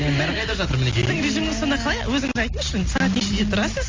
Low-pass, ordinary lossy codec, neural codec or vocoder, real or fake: 7.2 kHz; Opus, 32 kbps; none; real